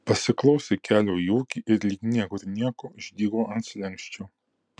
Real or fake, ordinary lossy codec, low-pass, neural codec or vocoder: real; MP3, 96 kbps; 9.9 kHz; none